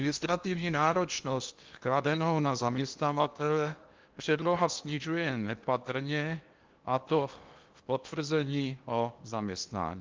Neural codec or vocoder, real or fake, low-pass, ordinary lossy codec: codec, 16 kHz in and 24 kHz out, 0.8 kbps, FocalCodec, streaming, 65536 codes; fake; 7.2 kHz; Opus, 32 kbps